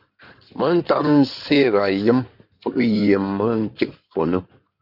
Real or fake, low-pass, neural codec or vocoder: fake; 5.4 kHz; codec, 16 kHz in and 24 kHz out, 2.2 kbps, FireRedTTS-2 codec